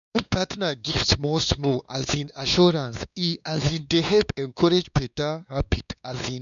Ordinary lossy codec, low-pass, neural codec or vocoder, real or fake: none; 7.2 kHz; codec, 16 kHz, 2 kbps, X-Codec, WavLM features, trained on Multilingual LibriSpeech; fake